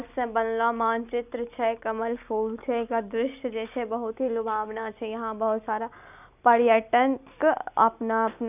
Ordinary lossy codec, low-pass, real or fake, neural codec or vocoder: none; 3.6 kHz; real; none